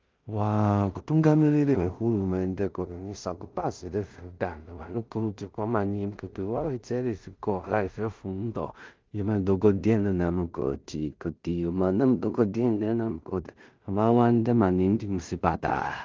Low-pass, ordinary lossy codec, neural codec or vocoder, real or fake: 7.2 kHz; Opus, 24 kbps; codec, 16 kHz in and 24 kHz out, 0.4 kbps, LongCat-Audio-Codec, two codebook decoder; fake